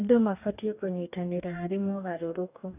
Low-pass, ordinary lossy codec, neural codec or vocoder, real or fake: 3.6 kHz; none; codec, 44.1 kHz, 2.6 kbps, DAC; fake